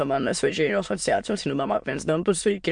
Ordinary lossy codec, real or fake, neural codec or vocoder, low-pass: MP3, 64 kbps; fake; autoencoder, 22.05 kHz, a latent of 192 numbers a frame, VITS, trained on many speakers; 9.9 kHz